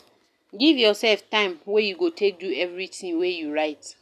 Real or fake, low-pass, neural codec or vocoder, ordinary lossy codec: real; 14.4 kHz; none; none